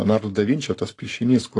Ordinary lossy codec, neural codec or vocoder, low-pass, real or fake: AAC, 48 kbps; codec, 44.1 kHz, 7.8 kbps, Pupu-Codec; 10.8 kHz; fake